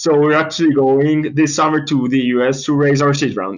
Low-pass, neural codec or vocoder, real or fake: 7.2 kHz; none; real